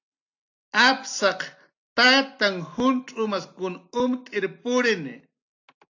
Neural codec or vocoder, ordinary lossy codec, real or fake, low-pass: none; AAC, 48 kbps; real; 7.2 kHz